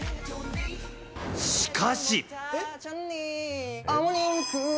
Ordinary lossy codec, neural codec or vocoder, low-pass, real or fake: none; none; none; real